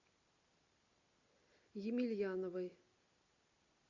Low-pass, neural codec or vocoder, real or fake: 7.2 kHz; none; real